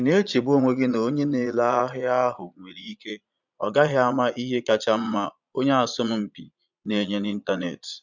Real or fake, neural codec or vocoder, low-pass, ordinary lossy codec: fake; vocoder, 22.05 kHz, 80 mel bands, Vocos; 7.2 kHz; none